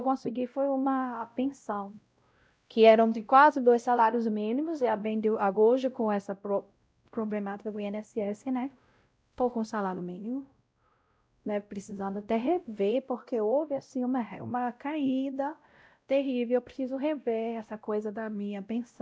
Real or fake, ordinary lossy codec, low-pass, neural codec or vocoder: fake; none; none; codec, 16 kHz, 0.5 kbps, X-Codec, WavLM features, trained on Multilingual LibriSpeech